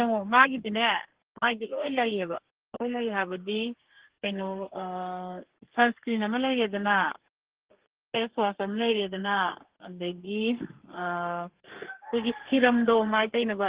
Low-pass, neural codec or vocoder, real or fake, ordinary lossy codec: 3.6 kHz; codec, 32 kHz, 1.9 kbps, SNAC; fake; Opus, 16 kbps